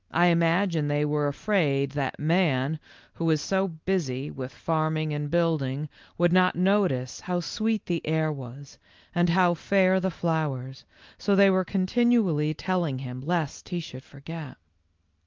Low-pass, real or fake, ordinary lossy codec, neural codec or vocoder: 7.2 kHz; real; Opus, 32 kbps; none